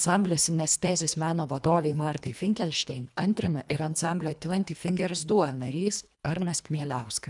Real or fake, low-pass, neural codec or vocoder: fake; 10.8 kHz; codec, 24 kHz, 1.5 kbps, HILCodec